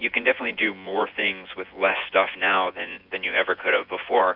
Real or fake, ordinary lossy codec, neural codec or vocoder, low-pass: fake; AAC, 48 kbps; vocoder, 24 kHz, 100 mel bands, Vocos; 5.4 kHz